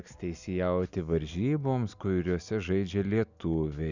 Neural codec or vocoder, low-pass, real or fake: none; 7.2 kHz; real